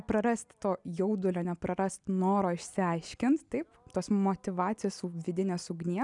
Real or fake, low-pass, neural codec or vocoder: real; 10.8 kHz; none